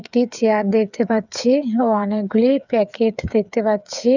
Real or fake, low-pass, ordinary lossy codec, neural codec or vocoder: fake; 7.2 kHz; none; codec, 16 kHz, 8 kbps, FreqCodec, smaller model